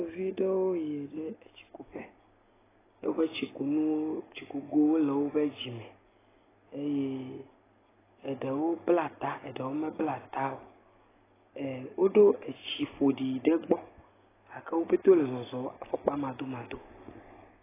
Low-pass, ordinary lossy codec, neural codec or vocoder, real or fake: 3.6 kHz; AAC, 16 kbps; none; real